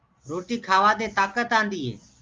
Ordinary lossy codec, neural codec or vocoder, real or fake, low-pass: Opus, 32 kbps; none; real; 7.2 kHz